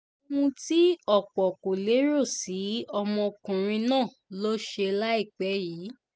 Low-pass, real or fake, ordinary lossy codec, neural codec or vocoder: none; real; none; none